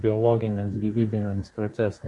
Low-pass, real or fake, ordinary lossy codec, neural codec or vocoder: 10.8 kHz; fake; MP3, 48 kbps; codec, 24 kHz, 1 kbps, SNAC